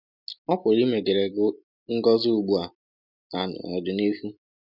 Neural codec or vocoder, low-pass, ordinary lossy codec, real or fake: none; 5.4 kHz; none; real